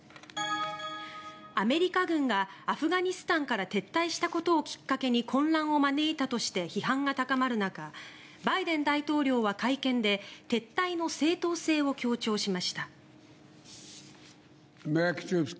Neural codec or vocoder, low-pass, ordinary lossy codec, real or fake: none; none; none; real